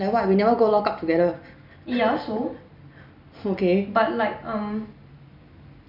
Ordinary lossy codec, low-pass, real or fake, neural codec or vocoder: none; 5.4 kHz; real; none